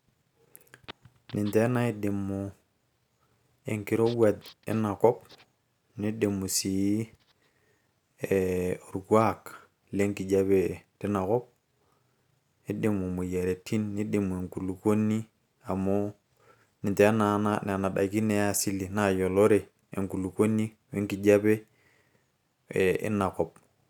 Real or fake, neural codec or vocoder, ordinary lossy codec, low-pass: real; none; none; 19.8 kHz